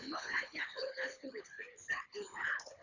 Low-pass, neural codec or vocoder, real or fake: 7.2 kHz; codec, 24 kHz, 3 kbps, HILCodec; fake